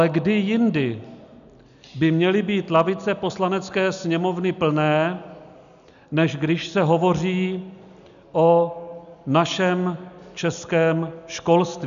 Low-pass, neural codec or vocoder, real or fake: 7.2 kHz; none; real